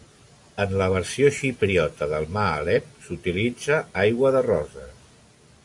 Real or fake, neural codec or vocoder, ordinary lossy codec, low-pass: real; none; AAC, 64 kbps; 10.8 kHz